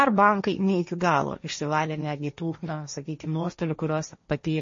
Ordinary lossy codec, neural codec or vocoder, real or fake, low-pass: MP3, 32 kbps; codec, 16 kHz, 1.1 kbps, Voila-Tokenizer; fake; 7.2 kHz